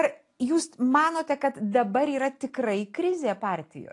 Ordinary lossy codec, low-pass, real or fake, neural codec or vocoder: AAC, 48 kbps; 10.8 kHz; real; none